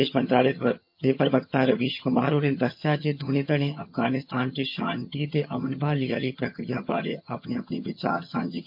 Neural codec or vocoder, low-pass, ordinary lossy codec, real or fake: vocoder, 22.05 kHz, 80 mel bands, HiFi-GAN; 5.4 kHz; MP3, 48 kbps; fake